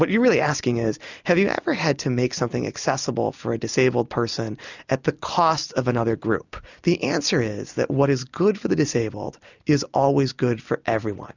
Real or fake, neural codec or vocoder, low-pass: real; none; 7.2 kHz